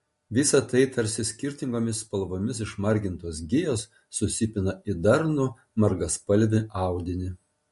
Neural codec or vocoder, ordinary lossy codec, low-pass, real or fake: none; MP3, 48 kbps; 14.4 kHz; real